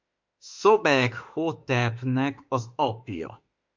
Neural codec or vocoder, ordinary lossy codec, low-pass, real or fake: autoencoder, 48 kHz, 32 numbers a frame, DAC-VAE, trained on Japanese speech; MP3, 48 kbps; 7.2 kHz; fake